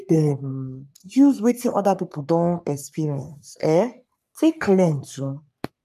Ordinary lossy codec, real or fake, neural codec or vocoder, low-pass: none; fake; codec, 44.1 kHz, 3.4 kbps, Pupu-Codec; 14.4 kHz